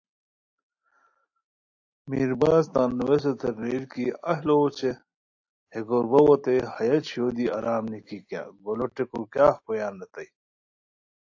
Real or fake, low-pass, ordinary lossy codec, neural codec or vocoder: real; 7.2 kHz; AAC, 48 kbps; none